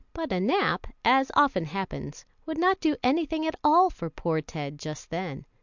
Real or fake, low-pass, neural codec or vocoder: real; 7.2 kHz; none